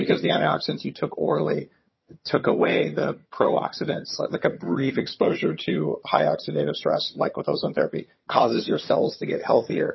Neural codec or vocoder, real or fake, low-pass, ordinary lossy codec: vocoder, 22.05 kHz, 80 mel bands, HiFi-GAN; fake; 7.2 kHz; MP3, 24 kbps